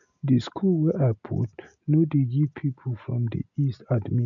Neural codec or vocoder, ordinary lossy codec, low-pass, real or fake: none; none; 7.2 kHz; real